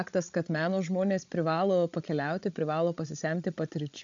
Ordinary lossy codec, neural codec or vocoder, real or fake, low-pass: AAC, 64 kbps; codec, 16 kHz, 16 kbps, FreqCodec, larger model; fake; 7.2 kHz